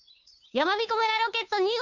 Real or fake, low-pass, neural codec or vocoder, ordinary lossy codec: fake; 7.2 kHz; codec, 16 kHz, 2 kbps, FunCodec, trained on Chinese and English, 25 frames a second; none